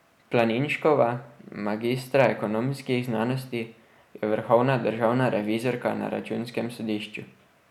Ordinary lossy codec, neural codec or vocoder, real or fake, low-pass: none; none; real; 19.8 kHz